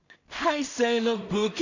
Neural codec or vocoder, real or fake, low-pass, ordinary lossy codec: codec, 16 kHz in and 24 kHz out, 0.4 kbps, LongCat-Audio-Codec, two codebook decoder; fake; 7.2 kHz; none